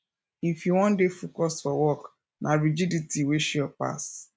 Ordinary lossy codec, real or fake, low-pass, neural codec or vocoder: none; real; none; none